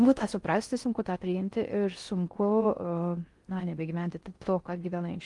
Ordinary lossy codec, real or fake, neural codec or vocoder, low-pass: Opus, 24 kbps; fake; codec, 16 kHz in and 24 kHz out, 0.6 kbps, FocalCodec, streaming, 4096 codes; 10.8 kHz